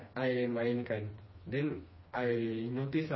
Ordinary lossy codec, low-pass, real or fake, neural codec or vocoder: MP3, 24 kbps; 7.2 kHz; fake; codec, 16 kHz, 2 kbps, FreqCodec, smaller model